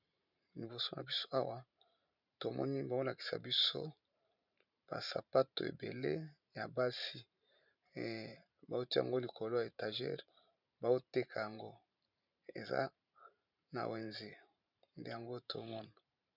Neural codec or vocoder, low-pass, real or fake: vocoder, 44.1 kHz, 128 mel bands every 512 samples, BigVGAN v2; 5.4 kHz; fake